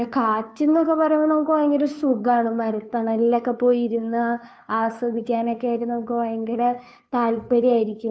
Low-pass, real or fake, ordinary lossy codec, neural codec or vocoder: none; fake; none; codec, 16 kHz, 2 kbps, FunCodec, trained on Chinese and English, 25 frames a second